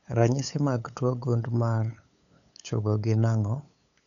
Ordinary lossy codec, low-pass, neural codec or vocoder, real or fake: none; 7.2 kHz; codec, 16 kHz, 8 kbps, FunCodec, trained on LibriTTS, 25 frames a second; fake